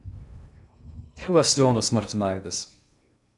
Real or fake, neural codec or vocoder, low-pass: fake; codec, 16 kHz in and 24 kHz out, 0.6 kbps, FocalCodec, streaming, 2048 codes; 10.8 kHz